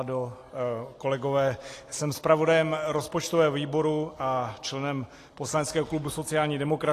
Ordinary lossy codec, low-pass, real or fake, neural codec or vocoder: AAC, 48 kbps; 14.4 kHz; real; none